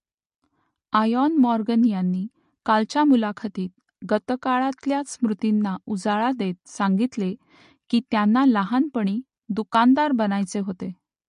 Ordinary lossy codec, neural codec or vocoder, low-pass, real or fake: MP3, 48 kbps; none; 14.4 kHz; real